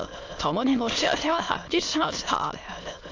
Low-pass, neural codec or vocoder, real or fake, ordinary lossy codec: 7.2 kHz; autoencoder, 22.05 kHz, a latent of 192 numbers a frame, VITS, trained on many speakers; fake; AAC, 48 kbps